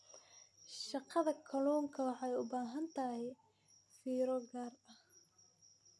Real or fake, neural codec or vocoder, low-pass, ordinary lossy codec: real; none; none; none